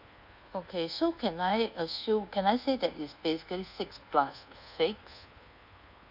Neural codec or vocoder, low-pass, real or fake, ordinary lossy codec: codec, 24 kHz, 1.2 kbps, DualCodec; 5.4 kHz; fake; none